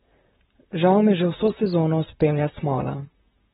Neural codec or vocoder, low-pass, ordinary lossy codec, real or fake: vocoder, 44.1 kHz, 128 mel bands, Pupu-Vocoder; 19.8 kHz; AAC, 16 kbps; fake